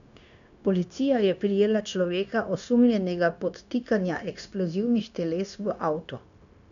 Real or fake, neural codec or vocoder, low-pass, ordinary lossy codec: fake; codec, 16 kHz, 0.9 kbps, LongCat-Audio-Codec; 7.2 kHz; none